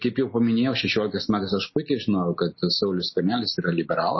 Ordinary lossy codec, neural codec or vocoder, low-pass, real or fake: MP3, 24 kbps; none; 7.2 kHz; real